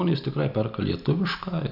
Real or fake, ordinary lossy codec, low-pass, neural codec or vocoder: real; AAC, 48 kbps; 5.4 kHz; none